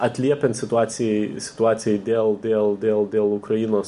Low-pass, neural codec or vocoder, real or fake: 10.8 kHz; none; real